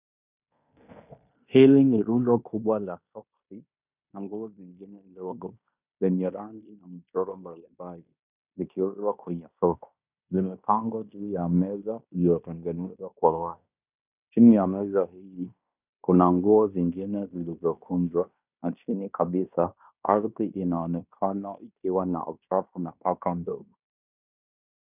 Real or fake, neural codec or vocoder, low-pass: fake; codec, 16 kHz in and 24 kHz out, 0.9 kbps, LongCat-Audio-Codec, fine tuned four codebook decoder; 3.6 kHz